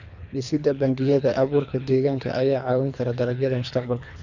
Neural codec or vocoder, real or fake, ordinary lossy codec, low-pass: codec, 24 kHz, 3 kbps, HILCodec; fake; none; 7.2 kHz